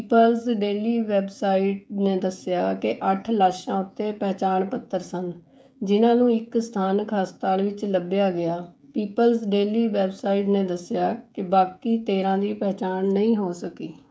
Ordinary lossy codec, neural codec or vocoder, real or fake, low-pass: none; codec, 16 kHz, 16 kbps, FreqCodec, smaller model; fake; none